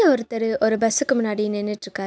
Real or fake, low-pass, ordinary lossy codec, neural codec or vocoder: real; none; none; none